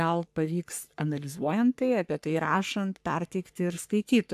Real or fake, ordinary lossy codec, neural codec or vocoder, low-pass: fake; AAC, 96 kbps; codec, 44.1 kHz, 3.4 kbps, Pupu-Codec; 14.4 kHz